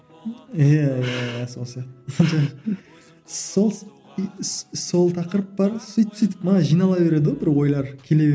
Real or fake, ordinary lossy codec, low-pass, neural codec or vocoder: real; none; none; none